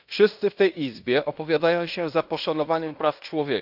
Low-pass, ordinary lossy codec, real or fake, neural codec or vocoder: 5.4 kHz; none; fake; codec, 16 kHz in and 24 kHz out, 0.9 kbps, LongCat-Audio-Codec, fine tuned four codebook decoder